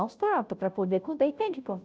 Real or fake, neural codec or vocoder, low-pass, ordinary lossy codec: fake; codec, 16 kHz, 0.5 kbps, FunCodec, trained on Chinese and English, 25 frames a second; none; none